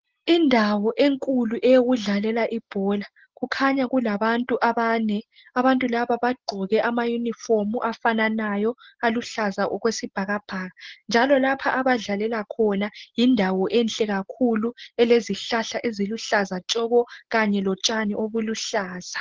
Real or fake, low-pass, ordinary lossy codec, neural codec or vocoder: real; 7.2 kHz; Opus, 16 kbps; none